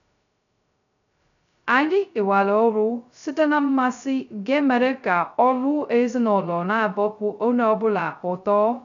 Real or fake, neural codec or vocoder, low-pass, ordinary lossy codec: fake; codec, 16 kHz, 0.2 kbps, FocalCodec; 7.2 kHz; none